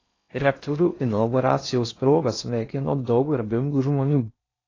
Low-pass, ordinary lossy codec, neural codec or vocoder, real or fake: 7.2 kHz; AAC, 32 kbps; codec, 16 kHz in and 24 kHz out, 0.6 kbps, FocalCodec, streaming, 4096 codes; fake